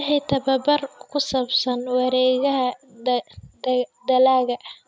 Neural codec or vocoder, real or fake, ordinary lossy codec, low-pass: none; real; none; none